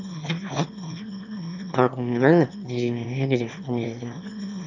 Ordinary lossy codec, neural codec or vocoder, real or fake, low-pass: none; autoencoder, 22.05 kHz, a latent of 192 numbers a frame, VITS, trained on one speaker; fake; 7.2 kHz